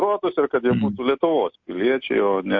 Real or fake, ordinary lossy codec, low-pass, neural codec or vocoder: real; MP3, 48 kbps; 7.2 kHz; none